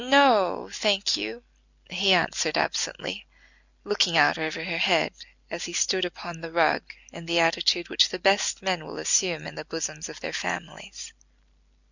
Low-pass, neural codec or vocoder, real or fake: 7.2 kHz; none; real